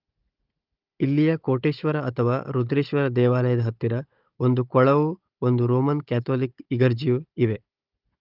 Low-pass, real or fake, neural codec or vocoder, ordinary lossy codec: 5.4 kHz; fake; codec, 16 kHz, 16 kbps, FunCodec, trained on Chinese and English, 50 frames a second; Opus, 24 kbps